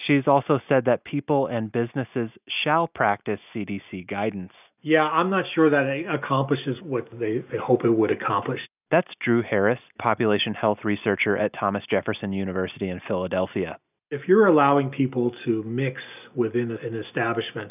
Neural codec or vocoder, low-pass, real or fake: none; 3.6 kHz; real